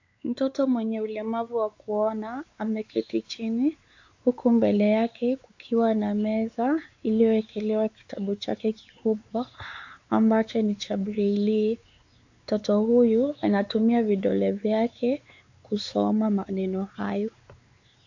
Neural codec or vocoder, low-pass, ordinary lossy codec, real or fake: codec, 16 kHz, 4 kbps, X-Codec, WavLM features, trained on Multilingual LibriSpeech; 7.2 kHz; AAC, 48 kbps; fake